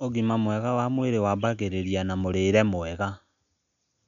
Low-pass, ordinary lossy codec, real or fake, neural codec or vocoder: 7.2 kHz; none; real; none